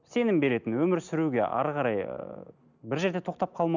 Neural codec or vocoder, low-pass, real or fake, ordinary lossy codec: none; 7.2 kHz; real; none